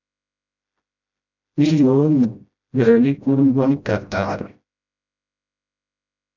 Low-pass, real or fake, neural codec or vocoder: 7.2 kHz; fake; codec, 16 kHz, 0.5 kbps, FreqCodec, smaller model